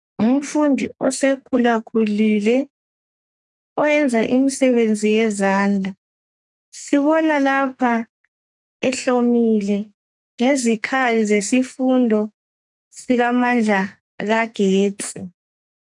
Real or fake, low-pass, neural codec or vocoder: fake; 10.8 kHz; codec, 44.1 kHz, 2.6 kbps, SNAC